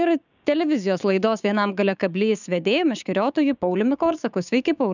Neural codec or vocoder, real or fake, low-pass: codec, 16 kHz, 6 kbps, DAC; fake; 7.2 kHz